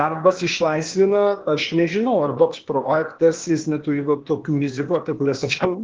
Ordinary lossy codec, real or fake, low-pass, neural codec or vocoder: Opus, 16 kbps; fake; 7.2 kHz; codec, 16 kHz, 0.8 kbps, ZipCodec